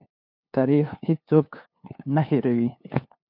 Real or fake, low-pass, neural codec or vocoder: fake; 5.4 kHz; codec, 16 kHz, 2 kbps, FunCodec, trained on LibriTTS, 25 frames a second